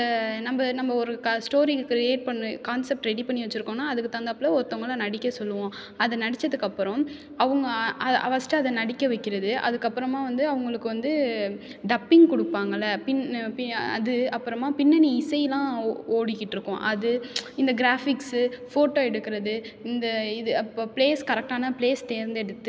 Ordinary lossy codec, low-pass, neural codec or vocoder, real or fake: none; none; none; real